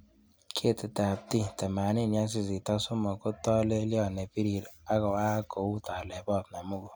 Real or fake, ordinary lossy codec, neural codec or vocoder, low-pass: real; none; none; none